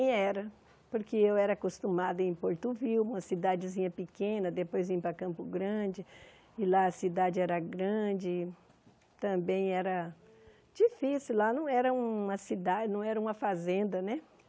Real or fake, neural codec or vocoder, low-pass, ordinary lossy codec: real; none; none; none